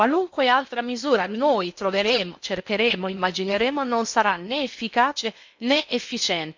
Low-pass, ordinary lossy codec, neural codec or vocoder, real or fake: 7.2 kHz; MP3, 64 kbps; codec, 16 kHz in and 24 kHz out, 0.8 kbps, FocalCodec, streaming, 65536 codes; fake